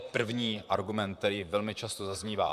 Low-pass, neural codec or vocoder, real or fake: 14.4 kHz; vocoder, 44.1 kHz, 128 mel bands, Pupu-Vocoder; fake